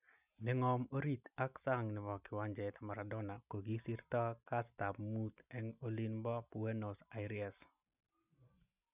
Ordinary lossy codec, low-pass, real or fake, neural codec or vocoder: none; 3.6 kHz; real; none